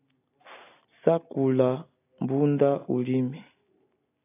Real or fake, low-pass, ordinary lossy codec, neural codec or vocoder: real; 3.6 kHz; AAC, 24 kbps; none